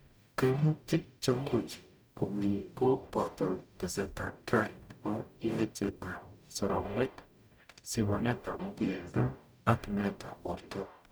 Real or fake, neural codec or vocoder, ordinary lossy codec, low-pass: fake; codec, 44.1 kHz, 0.9 kbps, DAC; none; none